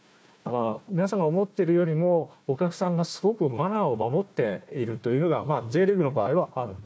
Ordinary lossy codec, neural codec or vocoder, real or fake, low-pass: none; codec, 16 kHz, 1 kbps, FunCodec, trained on Chinese and English, 50 frames a second; fake; none